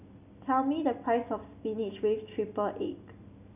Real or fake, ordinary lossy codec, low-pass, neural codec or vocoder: real; none; 3.6 kHz; none